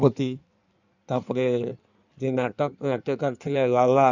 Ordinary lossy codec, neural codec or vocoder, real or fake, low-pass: none; codec, 16 kHz in and 24 kHz out, 1.1 kbps, FireRedTTS-2 codec; fake; 7.2 kHz